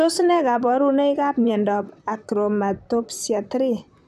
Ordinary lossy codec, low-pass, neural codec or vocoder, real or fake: none; 14.4 kHz; vocoder, 44.1 kHz, 128 mel bands, Pupu-Vocoder; fake